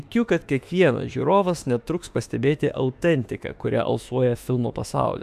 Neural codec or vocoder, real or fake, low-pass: autoencoder, 48 kHz, 32 numbers a frame, DAC-VAE, trained on Japanese speech; fake; 14.4 kHz